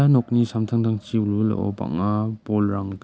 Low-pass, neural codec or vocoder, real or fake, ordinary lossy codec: none; none; real; none